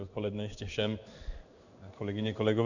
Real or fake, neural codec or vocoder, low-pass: fake; codec, 16 kHz in and 24 kHz out, 1 kbps, XY-Tokenizer; 7.2 kHz